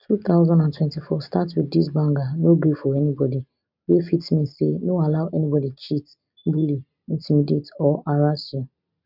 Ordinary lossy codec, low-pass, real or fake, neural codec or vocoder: none; 5.4 kHz; real; none